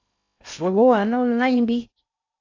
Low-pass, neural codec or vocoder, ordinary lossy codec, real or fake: 7.2 kHz; codec, 16 kHz in and 24 kHz out, 0.6 kbps, FocalCodec, streaming, 2048 codes; AAC, 48 kbps; fake